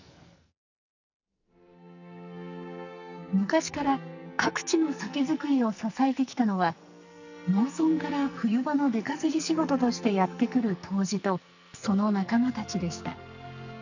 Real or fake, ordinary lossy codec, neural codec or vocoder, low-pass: fake; none; codec, 44.1 kHz, 2.6 kbps, SNAC; 7.2 kHz